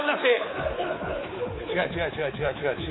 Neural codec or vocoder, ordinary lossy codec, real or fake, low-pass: codec, 24 kHz, 3.1 kbps, DualCodec; AAC, 16 kbps; fake; 7.2 kHz